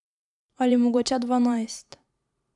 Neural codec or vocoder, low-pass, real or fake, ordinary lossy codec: vocoder, 44.1 kHz, 128 mel bands every 512 samples, BigVGAN v2; 10.8 kHz; fake; none